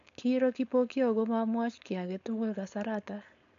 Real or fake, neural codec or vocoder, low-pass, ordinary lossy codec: fake; codec, 16 kHz, 4.8 kbps, FACodec; 7.2 kHz; none